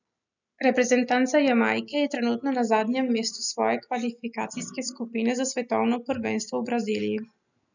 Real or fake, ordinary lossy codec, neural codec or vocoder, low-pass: real; none; none; 7.2 kHz